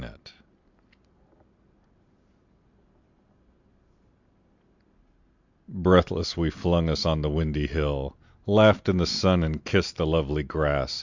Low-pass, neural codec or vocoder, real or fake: 7.2 kHz; none; real